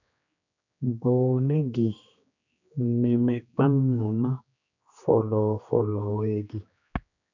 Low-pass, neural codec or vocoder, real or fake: 7.2 kHz; codec, 16 kHz, 2 kbps, X-Codec, HuBERT features, trained on general audio; fake